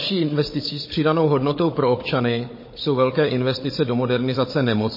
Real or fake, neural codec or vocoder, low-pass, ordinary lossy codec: fake; codec, 16 kHz, 16 kbps, FunCodec, trained on Chinese and English, 50 frames a second; 5.4 kHz; MP3, 24 kbps